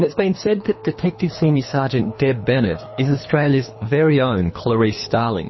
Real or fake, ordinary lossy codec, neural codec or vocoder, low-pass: fake; MP3, 24 kbps; codec, 24 kHz, 3 kbps, HILCodec; 7.2 kHz